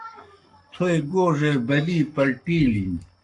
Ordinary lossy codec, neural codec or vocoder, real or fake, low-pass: AAC, 64 kbps; codec, 44.1 kHz, 7.8 kbps, Pupu-Codec; fake; 10.8 kHz